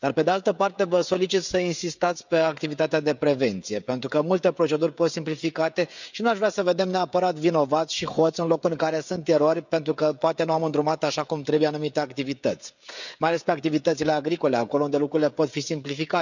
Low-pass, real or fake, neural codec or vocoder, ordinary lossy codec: 7.2 kHz; fake; codec, 16 kHz, 16 kbps, FreqCodec, smaller model; none